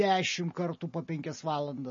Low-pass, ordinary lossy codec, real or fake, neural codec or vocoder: 7.2 kHz; MP3, 32 kbps; real; none